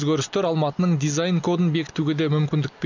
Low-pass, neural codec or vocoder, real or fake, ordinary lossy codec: 7.2 kHz; none; real; none